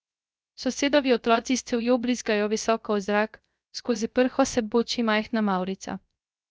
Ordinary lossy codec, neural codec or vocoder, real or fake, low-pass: none; codec, 16 kHz, 0.3 kbps, FocalCodec; fake; none